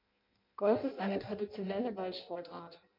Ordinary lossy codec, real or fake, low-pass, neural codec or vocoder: MP3, 48 kbps; fake; 5.4 kHz; codec, 16 kHz in and 24 kHz out, 0.6 kbps, FireRedTTS-2 codec